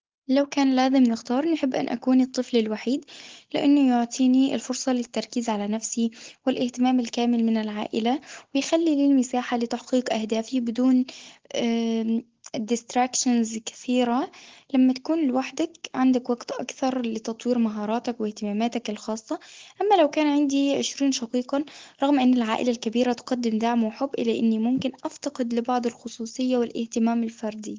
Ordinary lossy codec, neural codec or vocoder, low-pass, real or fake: Opus, 16 kbps; none; 7.2 kHz; real